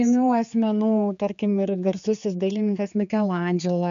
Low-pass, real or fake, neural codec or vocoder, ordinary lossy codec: 7.2 kHz; fake; codec, 16 kHz, 4 kbps, X-Codec, HuBERT features, trained on general audio; AAC, 96 kbps